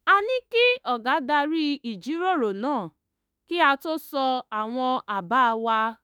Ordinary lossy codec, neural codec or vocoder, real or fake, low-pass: none; autoencoder, 48 kHz, 32 numbers a frame, DAC-VAE, trained on Japanese speech; fake; none